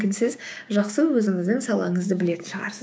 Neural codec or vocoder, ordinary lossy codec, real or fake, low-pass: codec, 16 kHz, 6 kbps, DAC; none; fake; none